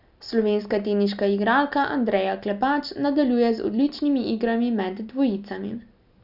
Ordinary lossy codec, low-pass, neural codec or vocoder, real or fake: none; 5.4 kHz; none; real